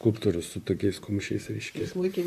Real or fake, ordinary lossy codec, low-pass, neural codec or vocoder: fake; AAC, 96 kbps; 14.4 kHz; vocoder, 44.1 kHz, 128 mel bands, Pupu-Vocoder